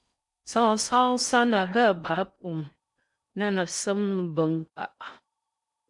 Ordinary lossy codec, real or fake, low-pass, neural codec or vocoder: MP3, 96 kbps; fake; 10.8 kHz; codec, 16 kHz in and 24 kHz out, 0.6 kbps, FocalCodec, streaming, 4096 codes